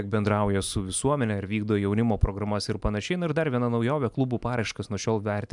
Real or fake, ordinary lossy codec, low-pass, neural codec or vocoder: real; MP3, 96 kbps; 10.8 kHz; none